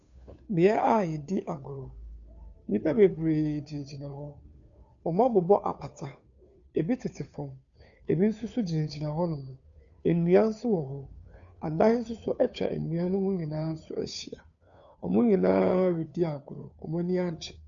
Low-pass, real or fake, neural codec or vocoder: 7.2 kHz; fake; codec, 16 kHz, 2 kbps, FunCodec, trained on Chinese and English, 25 frames a second